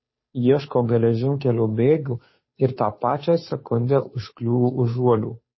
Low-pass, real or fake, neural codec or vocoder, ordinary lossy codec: 7.2 kHz; fake; codec, 16 kHz, 2 kbps, FunCodec, trained on Chinese and English, 25 frames a second; MP3, 24 kbps